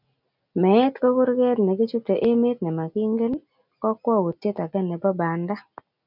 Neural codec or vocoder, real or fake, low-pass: none; real; 5.4 kHz